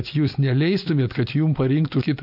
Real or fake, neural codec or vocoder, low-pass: real; none; 5.4 kHz